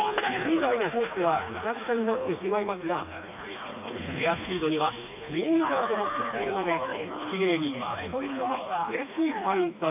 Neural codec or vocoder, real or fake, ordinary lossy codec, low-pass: codec, 16 kHz, 2 kbps, FreqCodec, smaller model; fake; AAC, 32 kbps; 3.6 kHz